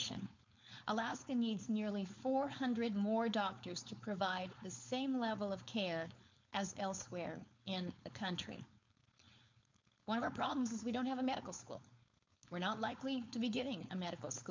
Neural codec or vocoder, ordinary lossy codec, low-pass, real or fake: codec, 16 kHz, 4.8 kbps, FACodec; MP3, 64 kbps; 7.2 kHz; fake